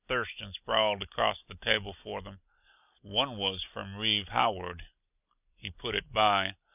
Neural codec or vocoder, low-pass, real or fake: none; 3.6 kHz; real